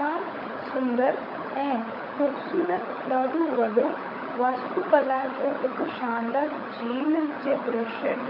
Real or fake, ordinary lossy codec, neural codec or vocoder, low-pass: fake; MP3, 32 kbps; codec, 16 kHz, 16 kbps, FunCodec, trained on LibriTTS, 50 frames a second; 5.4 kHz